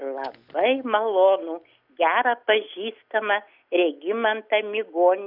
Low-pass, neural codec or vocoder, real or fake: 5.4 kHz; none; real